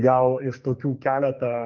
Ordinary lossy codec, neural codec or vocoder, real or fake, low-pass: Opus, 32 kbps; codec, 44.1 kHz, 2.6 kbps, SNAC; fake; 7.2 kHz